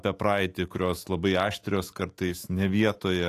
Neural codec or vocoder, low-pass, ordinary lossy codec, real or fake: autoencoder, 48 kHz, 128 numbers a frame, DAC-VAE, trained on Japanese speech; 14.4 kHz; AAC, 48 kbps; fake